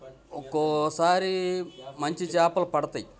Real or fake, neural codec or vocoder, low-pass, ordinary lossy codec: real; none; none; none